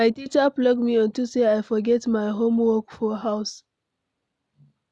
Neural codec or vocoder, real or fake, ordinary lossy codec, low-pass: none; real; none; none